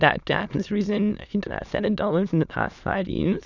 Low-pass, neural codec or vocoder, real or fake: 7.2 kHz; autoencoder, 22.05 kHz, a latent of 192 numbers a frame, VITS, trained on many speakers; fake